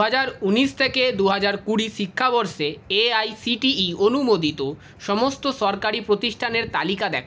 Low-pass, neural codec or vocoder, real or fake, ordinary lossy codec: none; none; real; none